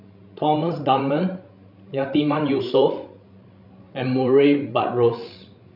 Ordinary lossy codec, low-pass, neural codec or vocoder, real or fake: none; 5.4 kHz; codec, 16 kHz, 16 kbps, FreqCodec, larger model; fake